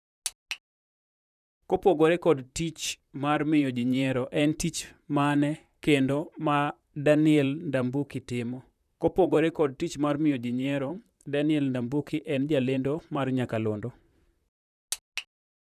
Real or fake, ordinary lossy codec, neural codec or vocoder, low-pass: fake; none; vocoder, 44.1 kHz, 128 mel bands, Pupu-Vocoder; 14.4 kHz